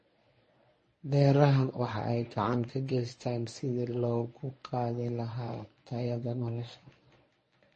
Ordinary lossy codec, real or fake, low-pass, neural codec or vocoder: MP3, 32 kbps; fake; 10.8 kHz; codec, 24 kHz, 0.9 kbps, WavTokenizer, medium speech release version 1